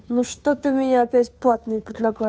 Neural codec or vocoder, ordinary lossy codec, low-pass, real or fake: codec, 16 kHz, 2 kbps, FunCodec, trained on Chinese and English, 25 frames a second; none; none; fake